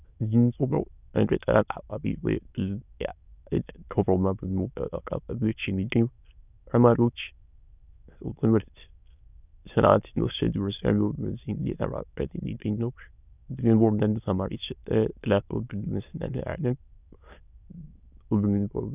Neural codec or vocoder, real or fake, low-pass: autoencoder, 22.05 kHz, a latent of 192 numbers a frame, VITS, trained on many speakers; fake; 3.6 kHz